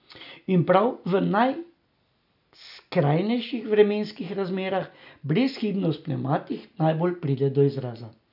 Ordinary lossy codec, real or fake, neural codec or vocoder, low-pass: none; real; none; 5.4 kHz